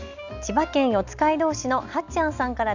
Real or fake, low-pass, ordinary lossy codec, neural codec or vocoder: real; 7.2 kHz; none; none